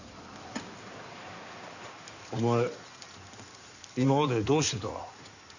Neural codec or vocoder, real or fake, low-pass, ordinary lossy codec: codec, 16 kHz in and 24 kHz out, 2.2 kbps, FireRedTTS-2 codec; fake; 7.2 kHz; none